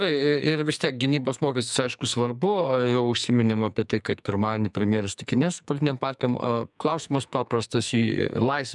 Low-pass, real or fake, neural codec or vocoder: 10.8 kHz; fake; codec, 44.1 kHz, 2.6 kbps, SNAC